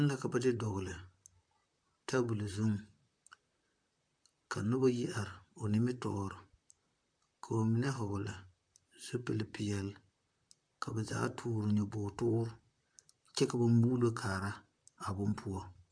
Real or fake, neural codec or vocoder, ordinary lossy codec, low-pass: fake; vocoder, 44.1 kHz, 128 mel bands, Pupu-Vocoder; MP3, 64 kbps; 9.9 kHz